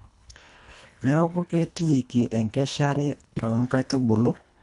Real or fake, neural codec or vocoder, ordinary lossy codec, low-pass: fake; codec, 24 kHz, 1.5 kbps, HILCodec; none; 10.8 kHz